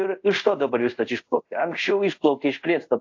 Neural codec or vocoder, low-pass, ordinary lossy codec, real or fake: codec, 24 kHz, 0.5 kbps, DualCodec; 7.2 kHz; AAC, 48 kbps; fake